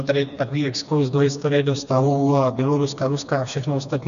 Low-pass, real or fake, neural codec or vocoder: 7.2 kHz; fake; codec, 16 kHz, 2 kbps, FreqCodec, smaller model